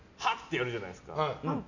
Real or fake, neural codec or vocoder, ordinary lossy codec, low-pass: real; none; none; 7.2 kHz